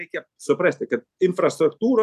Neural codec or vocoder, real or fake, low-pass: none; real; 14.4 kHz